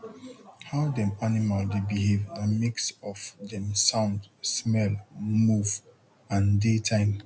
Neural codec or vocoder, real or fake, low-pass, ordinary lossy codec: none; real; none; none